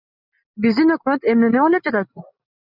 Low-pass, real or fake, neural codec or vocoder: 5.4 kHz; real; none